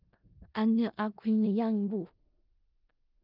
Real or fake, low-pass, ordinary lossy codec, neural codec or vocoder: fake; 5.4 kHz; Opus, 24 kbps; codec, 16 kHz in and 24 kHz out, 0.4 kbps, LongCat-Audio-Codec, four codebook decoder